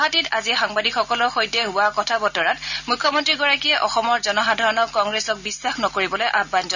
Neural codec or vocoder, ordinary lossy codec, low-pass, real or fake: none; none; 7.2 kHz; real